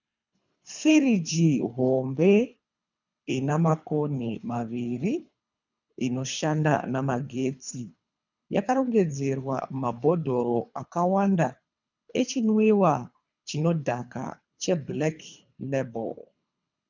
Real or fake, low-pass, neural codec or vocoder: fake; 7.2 kHz; codec, 24 kHz, 3 kbps, HILCodec